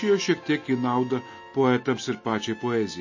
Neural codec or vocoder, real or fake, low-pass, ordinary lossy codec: none; real; 7.2 kHz; MP3, 32 kbps